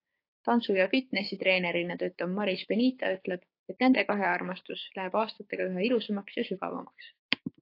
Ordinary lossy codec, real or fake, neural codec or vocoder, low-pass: AAC, 32 kbps; fake; codec, 16 kHz, 6 kbps, DAC; 5.4 kHz